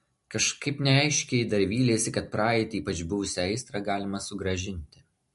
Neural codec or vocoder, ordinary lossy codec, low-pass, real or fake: none; MP3, 48 kbps; 14.4 kHz; real